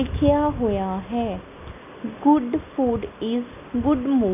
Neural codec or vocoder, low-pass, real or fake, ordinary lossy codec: none; 3.6 kHz; real; none